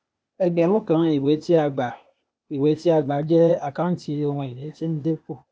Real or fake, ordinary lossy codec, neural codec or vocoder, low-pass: fake; none; codec, 16 kHz, 0.8 kbps, ZipCodec; none